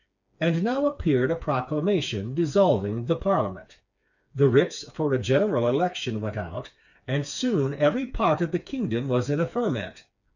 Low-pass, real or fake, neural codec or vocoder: 7.2 kHz; fake; codec, 16 kHz, 4 kbps, FreqCodec, smaller model